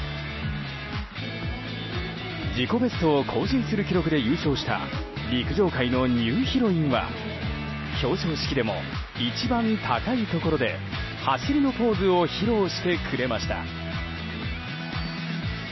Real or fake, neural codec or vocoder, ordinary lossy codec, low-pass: real; none; MP3, 24 kbps; 7.2 kHz